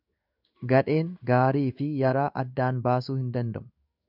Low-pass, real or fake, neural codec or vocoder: 5.4 kHz; fake; codec, 16 kHz in and 24 kHz out, 1 kbps, XY-Tokenizer